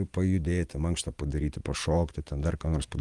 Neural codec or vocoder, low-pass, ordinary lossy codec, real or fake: none; 10.8 kHz; Opus, 24 kbps; real